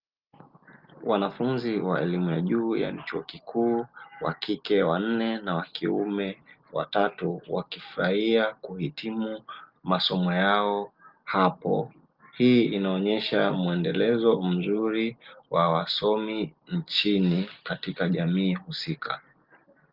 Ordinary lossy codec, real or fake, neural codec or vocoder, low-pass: Opus, 32 kbps; real; none; 5.4 kHz